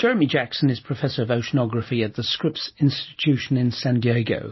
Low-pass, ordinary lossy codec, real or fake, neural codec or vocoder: 7.2 kHz; MP3, 24 kbps; real; none